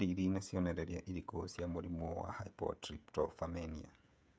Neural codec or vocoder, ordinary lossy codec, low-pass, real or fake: codec, 16 kHz, 16 kbps, FreqCodec, smaller model; none; none; fake